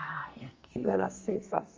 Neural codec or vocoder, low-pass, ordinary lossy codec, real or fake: codec, 44.1 kHz, 2.6 kbps, SNAC; 7.2 kHz; Opus, 32 kbps; fake